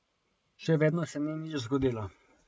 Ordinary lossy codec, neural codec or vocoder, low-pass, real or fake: none; none; none; real